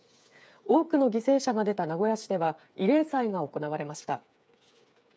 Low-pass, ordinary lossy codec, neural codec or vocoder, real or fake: none; none; codec, 16 kHz, 8 kbps, FreqCodec, smaller model; fake